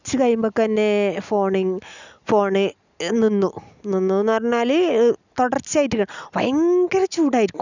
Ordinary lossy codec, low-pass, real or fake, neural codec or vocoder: none; 7.2 kHz; real; none